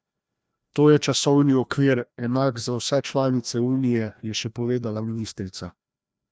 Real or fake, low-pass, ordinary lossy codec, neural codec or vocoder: fake; none; none; codec, 16 kHz, 1 kbps, FreqCodec, larger model